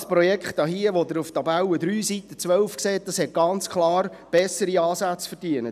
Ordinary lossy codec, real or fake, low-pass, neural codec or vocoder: none; real; 14.4 kHz; none